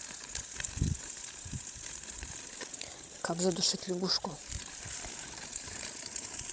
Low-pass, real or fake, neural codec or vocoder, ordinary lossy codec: none; fake; codec, 16 kHz, 16 kbps, FreqCodec, larger model; none